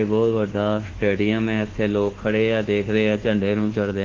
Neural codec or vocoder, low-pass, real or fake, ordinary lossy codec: codec, 24 kHz, 1.2 kbps, DualCodec; 7.2 kHz; fake; Opus, 16 kbps